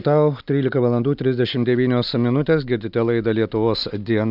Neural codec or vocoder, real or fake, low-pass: none; real; 5.4 kHz